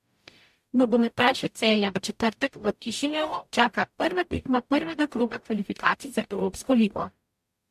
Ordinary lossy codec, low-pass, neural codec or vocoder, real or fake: MP3, 64 kbps; 14.4 kHz; codec, 44.1 kHz, 0.9 kbps, DAC; fake